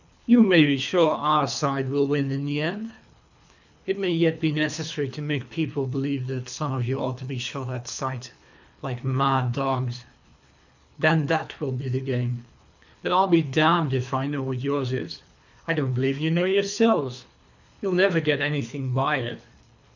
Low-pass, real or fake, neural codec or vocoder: 7.2 kHz; fake; codec, 24 kHz, 3 kbps, HILCodec